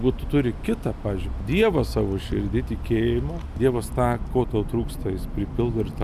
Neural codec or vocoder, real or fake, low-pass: none; real; 14.4 kHz